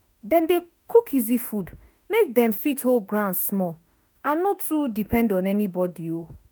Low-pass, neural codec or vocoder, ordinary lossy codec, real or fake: none; autoencoder, 48 kHz, 32 numbers a frame, DAC-VAE, trained on Japanese speech; none; fake